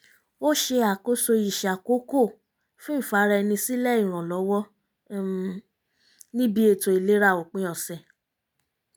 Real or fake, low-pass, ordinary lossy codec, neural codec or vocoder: real; none; none; none